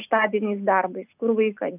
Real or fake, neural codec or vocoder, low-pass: real; none; 3.6 kHz